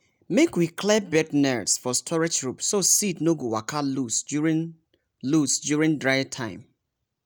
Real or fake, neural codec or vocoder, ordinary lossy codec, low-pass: real; none; none; none